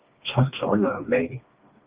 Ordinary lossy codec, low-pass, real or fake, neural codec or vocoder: Opus, 24 kbps; 3.6 kHz; fake; codec, 16 kHz, 2 kbps, FreqCodec, smaller model